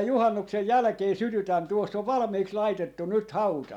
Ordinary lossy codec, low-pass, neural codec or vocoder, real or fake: none; 19.8 kHz; none; real